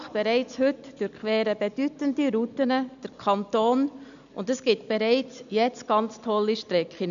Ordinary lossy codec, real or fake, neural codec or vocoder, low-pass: none; real; none; 7.2 kHz